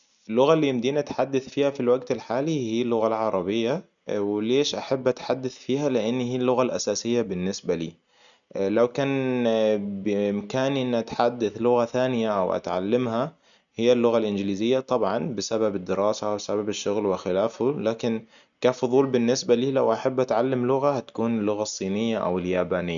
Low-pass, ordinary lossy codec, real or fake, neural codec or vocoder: 7.2 kHz; none; real; none